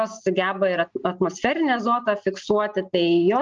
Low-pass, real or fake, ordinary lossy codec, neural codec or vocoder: 7.2 kHz; real; Opus, 24 kbps; none